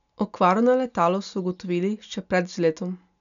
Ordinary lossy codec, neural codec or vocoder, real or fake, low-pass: none; none; real; 7.2 kHz